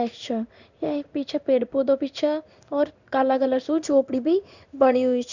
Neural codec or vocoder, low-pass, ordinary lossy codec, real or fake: codec, 16 kHz in and 24 kHz out, 1 kbps, XY-Tokenizer; 7.2 kHz; none; fake